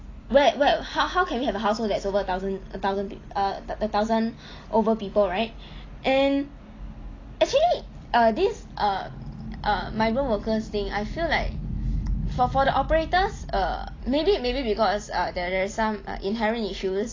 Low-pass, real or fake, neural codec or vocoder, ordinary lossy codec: 7.2 kHz; real; none; AAC, 32 kbps